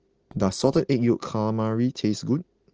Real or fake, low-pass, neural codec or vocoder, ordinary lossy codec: real; 7.2 kHz; none; Opus, 16 kbps